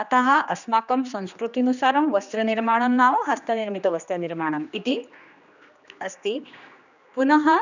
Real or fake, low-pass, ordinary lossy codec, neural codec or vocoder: fake; 7.2 kHz; none; codec, 16 kHz, 2 kbps, X-Codec, HuBERT features, trained on general audio